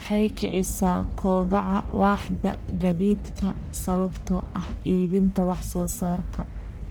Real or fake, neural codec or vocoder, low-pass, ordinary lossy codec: fake; codec, 44.1 kHz, 1.7 kbps, Pupu-Codec; none; none